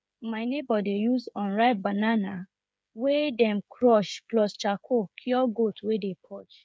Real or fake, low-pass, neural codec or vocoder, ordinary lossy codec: fake; none; codec, 16 kHz, 8 kbps, FreqCodec, smaller model; none